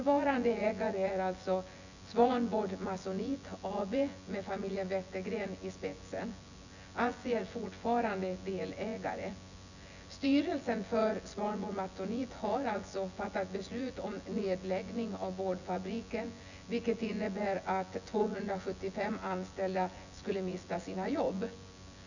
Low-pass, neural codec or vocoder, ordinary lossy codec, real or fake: 7.2 kHz; vocoder, 24 kHz, 100 mel bands, Vocos; none; fake